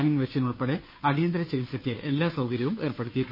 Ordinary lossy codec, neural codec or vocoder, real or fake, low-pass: MP3, 24 kbps; codec, 16 kHz, 2 kbps, FunCodec, trained on Chinese and English, 25 frames a second; fake; 5.4 kHz